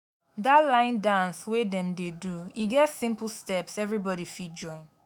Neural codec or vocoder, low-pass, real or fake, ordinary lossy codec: autoencoder, 48 kHz, 128 numbers a frame, DAC-VAE, trained on Japanese speech; none; fake; none